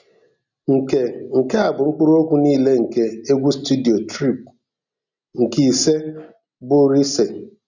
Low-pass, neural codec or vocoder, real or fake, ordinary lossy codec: 7.2 kHz; none; real; none